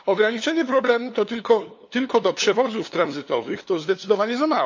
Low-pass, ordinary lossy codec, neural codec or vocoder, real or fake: 7.2 kHz; AAC, 48 kbps; codec, 16 kHz, 2 kbps, FunCodec, trained on LibriTTS, 25 frames a second; fake